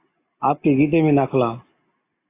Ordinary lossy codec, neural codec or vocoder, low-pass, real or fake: AAC, 24 kbps; none; 3.6 kHz; real